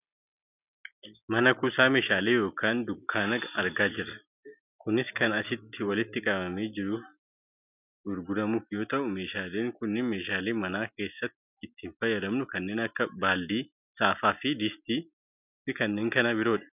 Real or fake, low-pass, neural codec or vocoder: real; 3.6 kHz; none